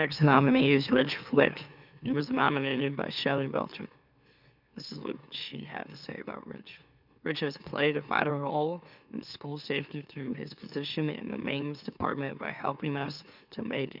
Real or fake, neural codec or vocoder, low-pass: fake; autoencoder, 44.1 kHz, a latent of 192 numbers a frame, MeloTTS; 5.4 kHz